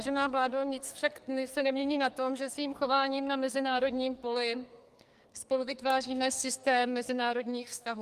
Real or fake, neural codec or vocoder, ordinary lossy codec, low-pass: fake; codec, 44.1 kHz, 2.6 kbps, SNAC; Opus, 32 kbps; 14.4 kHz